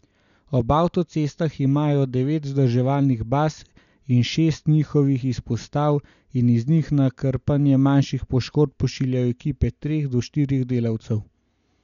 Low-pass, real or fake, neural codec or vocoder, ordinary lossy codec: 7.2 kHz; real; none; none